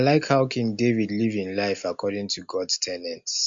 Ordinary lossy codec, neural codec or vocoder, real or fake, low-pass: MP3, 48 kbps; none; real; 7.2 kHz